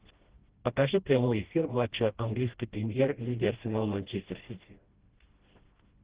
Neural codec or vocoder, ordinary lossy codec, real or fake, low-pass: codec, 16 kHz, 1 kbps, FreqCodec, smaller model; Opus, 16 kbps; fake; 3.6 kHz